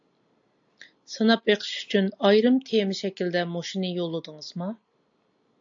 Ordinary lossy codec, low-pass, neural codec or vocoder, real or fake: AAC, 64 kbps; 7.2 kHz; none; real